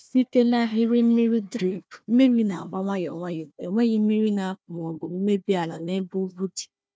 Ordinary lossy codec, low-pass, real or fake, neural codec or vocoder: none; none; fake; codec, 16 kHz, 1 kbps, FunCodec, trained on Chinese and English, 50 frames a second